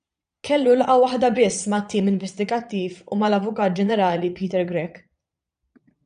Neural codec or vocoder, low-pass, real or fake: vocoder, 22.05 kHz, 80 mel bands, Vocos; 9.9 kHz; fake